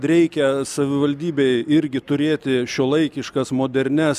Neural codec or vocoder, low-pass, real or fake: none; 14.4 kHz; real